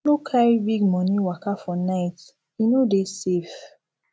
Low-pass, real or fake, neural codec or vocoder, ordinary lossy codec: none; real; none; none